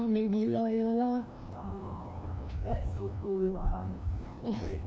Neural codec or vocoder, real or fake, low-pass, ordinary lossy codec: codec, 16 kHz, 1 kbps, FreqCodec, larger model; fake; none; none